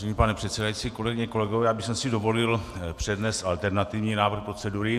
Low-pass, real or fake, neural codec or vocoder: 14.4 kHz; fake; vocoder, 48 kHz, 128 mel bands, Vocos